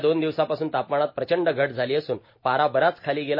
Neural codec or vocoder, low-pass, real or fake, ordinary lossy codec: none; 5.4 kHz; real; MP3, 24 kbps